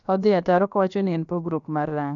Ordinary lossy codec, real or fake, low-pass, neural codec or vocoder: none; fake; 7.2 kHz; codec, 16 kHz, about 1 kbps, DyCAST, with the encoder's durations